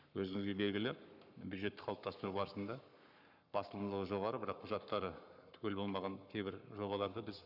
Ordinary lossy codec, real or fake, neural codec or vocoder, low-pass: none; fake; codec, 44.1 kHz, 7.8 kbps, Pupu-Codec; 5.4 kHz